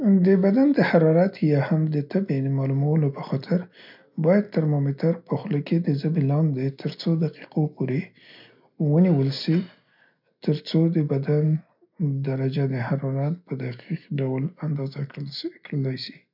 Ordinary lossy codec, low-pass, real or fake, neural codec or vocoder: none; 5.4 kHz; real; none